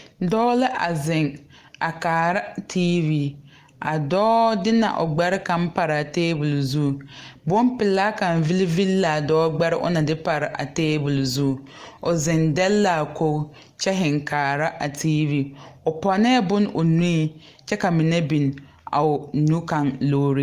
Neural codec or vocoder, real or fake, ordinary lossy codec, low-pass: none; real; Opus, 24 kbps; 14.4 kHz